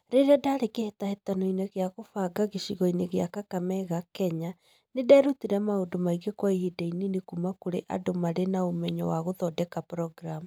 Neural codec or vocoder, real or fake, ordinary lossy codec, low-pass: none; real; none; none